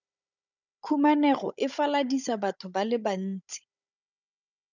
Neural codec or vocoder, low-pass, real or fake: codec, 16 kHz, 16 kbps, FunCodec, trained on Chinese and English, 50 frames a second; 7.2 kHz; fake